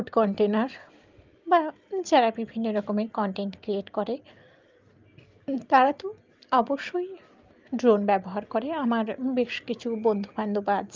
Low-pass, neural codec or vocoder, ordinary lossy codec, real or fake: 7.2 kHz; none; Opus, 32 kbps; real